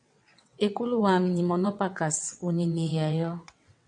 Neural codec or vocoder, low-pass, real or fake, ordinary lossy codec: vocoder, 22.05 kHz, 80 mel bands, WaveNeXt; 9.9 kHz; fake; MP3, 64 kbps